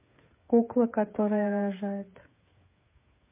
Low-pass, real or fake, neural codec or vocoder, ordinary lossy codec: 3.6 kHz; fake; codec, 16 kHz, 8 kbps, FreqCodec, smaller model; MP3, 24 kbps